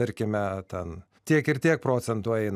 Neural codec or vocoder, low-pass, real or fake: none; 14.4 kHz; real